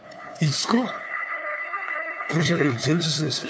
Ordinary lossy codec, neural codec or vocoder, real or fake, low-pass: none; codec, 16 kHz, 2 kbps, FunCodec, trained on LibriTTS, 25 frames a second; fake; none